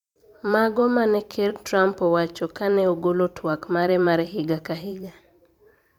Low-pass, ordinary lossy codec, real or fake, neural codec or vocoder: 19.8 kHz; none; fake; vocoder, 44.1 kHz, 128 mel bands every 512 samples, BigVGAN v2